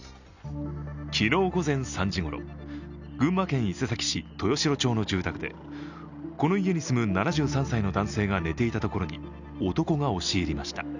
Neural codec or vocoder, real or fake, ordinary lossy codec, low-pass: none; real; none; 7.2 kHz